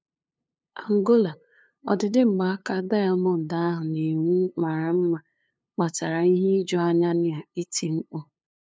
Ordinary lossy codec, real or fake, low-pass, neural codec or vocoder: none; fake; none; codec, 16 kHz, 2 kbps, FunCodec, trained on LibriTTS, 25 frames a second